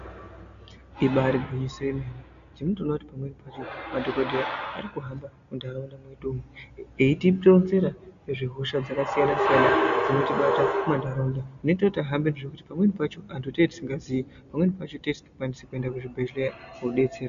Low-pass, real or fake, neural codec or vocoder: 7.2 kHz; real; none